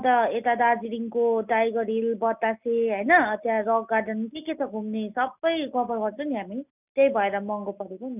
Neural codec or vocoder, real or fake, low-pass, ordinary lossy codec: none; real; 3.6 kHz; none